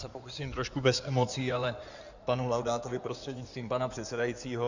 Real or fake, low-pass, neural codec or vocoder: fake; 7.2 kHz; codec, 16 kHz in and 24 kHz out, 2.2 kbps, FireRedTTS-2 codec